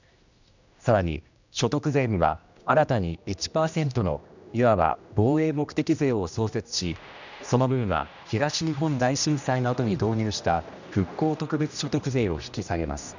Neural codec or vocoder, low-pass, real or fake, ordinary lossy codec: codec, 16 kHz, 1 kbps, X-Codec, HuBERT features, trained on general audio; 7.2 kHz; fake; none